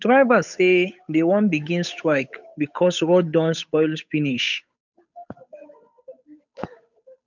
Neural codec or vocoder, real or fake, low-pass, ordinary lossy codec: codec, 16 kHz, 8 kbps, FunCodec, trained on Chinese and English, 25 frames a second; fake; 7.2 kHz; none